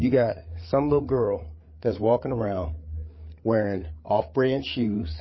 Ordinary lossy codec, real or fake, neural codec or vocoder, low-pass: MP3, 24 kbps; fake; codec, 16 kHz, 8 kbps, FreqCodec, larger model; 7.2 kHz